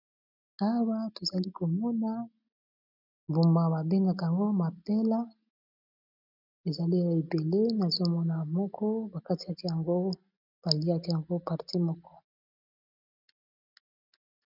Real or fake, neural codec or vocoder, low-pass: real; none; 5.4 kHz